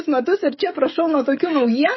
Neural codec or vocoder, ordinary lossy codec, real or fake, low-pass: codec, 16 kHz, 16 kbps, FreqCodec, smaller model; MP3, 24 kbps; fake; 7.2 kHz